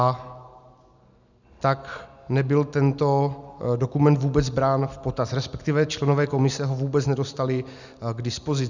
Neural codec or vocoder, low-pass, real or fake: none; 7.2 kHz; real